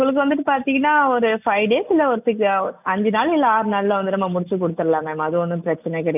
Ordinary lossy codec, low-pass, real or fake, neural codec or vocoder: none; 3.6 kHz; real; none